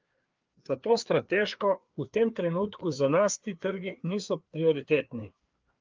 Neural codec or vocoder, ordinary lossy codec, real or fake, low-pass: codec, 16 kHz, 2 kbps, FreqCodec, larger model; Opus, 32 kbps; fake; 7.2 kHz